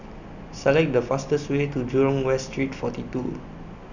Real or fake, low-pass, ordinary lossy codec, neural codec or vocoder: real; 7.2 kHz; none; none